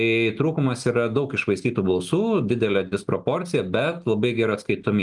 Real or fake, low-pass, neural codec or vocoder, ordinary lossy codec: real; 10.8 kHz; none; Opus, 32 kbps